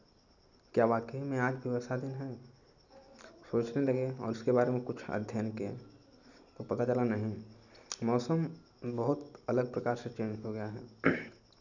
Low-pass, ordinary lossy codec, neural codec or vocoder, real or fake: 7.2 kHz; none; none; real